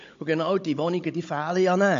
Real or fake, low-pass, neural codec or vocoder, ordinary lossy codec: fake; 7.2 kHz; codec, 16 kHz, 16 kbps, FunCodec, trained on Chinese and English, 50 frames a second; MP3, 48 kbps